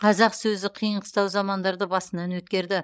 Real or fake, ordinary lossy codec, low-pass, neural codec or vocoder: fake; none; none; codec, 16 kHz, 16 kbps, FreqCodec, larger model